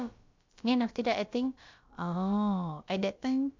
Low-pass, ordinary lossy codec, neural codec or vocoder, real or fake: 7.2 kHz; MP3, 64 kbps; codec, 16 kHz, about 1 kbps, DyCAST, with the encoder's durations; fake